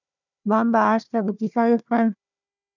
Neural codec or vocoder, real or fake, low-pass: codec, 16 kHz, 1 kbps, FunCodec, trained on Chinese and English, 50 frames a second; fake; 7.2 kHz